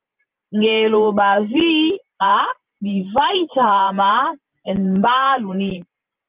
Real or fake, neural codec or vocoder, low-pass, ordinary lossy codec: fake; vocoder, 44.1 kHz, 128 mel bands every 512 samples, BigVGAN v2; 3.6 kHz; Opus, 24 kbps